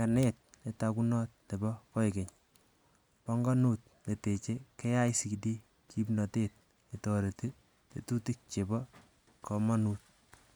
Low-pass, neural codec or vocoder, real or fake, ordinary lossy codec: none; none; real; none